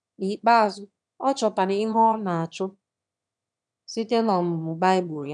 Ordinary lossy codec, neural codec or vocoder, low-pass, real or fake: none; autoencoder, 22.05 kHz, a latent of 192 numbers a frame, VITS, trained on one speaker; 9.9 kHz; fake